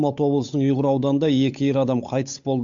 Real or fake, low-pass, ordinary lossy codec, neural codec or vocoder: fake; 7.2 kHz; AAC, 64 kbps; codec, 16 kHz, 8 kbps, FunCodec, trained on Chinese and English, 25 frames a second